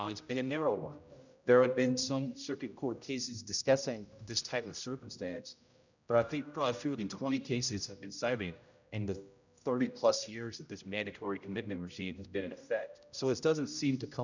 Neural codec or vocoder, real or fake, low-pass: codec, 16 kHz, 0.5 kbps, X-Codec, HuBERT features, trained on general audio; fake; 7.2 kHz